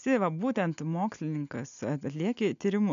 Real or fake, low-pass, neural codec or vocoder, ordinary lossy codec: real; 7.2 kHz; none; MP3, 64 kbps